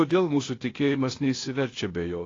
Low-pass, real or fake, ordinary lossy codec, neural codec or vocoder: 7.2 kHz; fake; AAC, 32 kbps; codec, 16 kHz, 0.8 kbps, ZipCodec